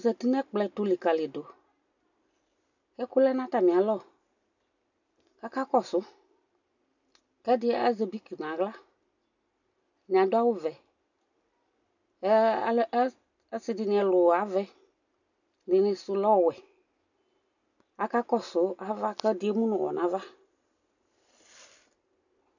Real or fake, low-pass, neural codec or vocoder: real; 7.2 kHz; none